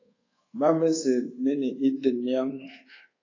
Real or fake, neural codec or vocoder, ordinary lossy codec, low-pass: fake; codec, 24 kHz, 1.2 kbps, DualCodec; AAC, 32 kbps; 7.2 kHz